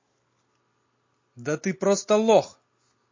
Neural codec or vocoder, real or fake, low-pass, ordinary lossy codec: none; real; 7.2 kHz; MP3, 32 kbps